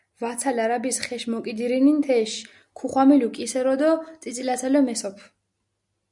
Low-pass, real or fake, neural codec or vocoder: 10.8 kHz; real; none